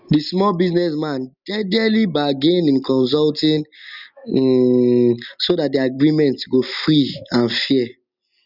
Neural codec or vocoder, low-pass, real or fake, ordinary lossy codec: none; 5.4 kHz; real; none